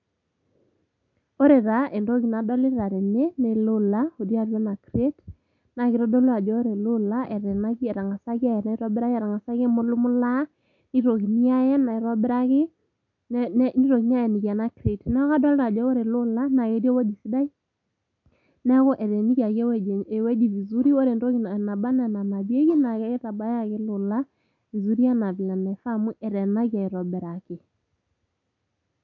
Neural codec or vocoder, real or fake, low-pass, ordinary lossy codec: none; real; 7.2 kHz; none